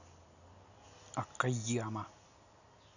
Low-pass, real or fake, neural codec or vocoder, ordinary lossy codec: 7.2 kHz; real; none; none